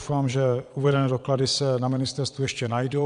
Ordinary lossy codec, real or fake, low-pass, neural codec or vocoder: MP3, 96 kbps; fake; 9.9 kHz; vocoder, 22.05 kHz, 80 mel bands, Vocos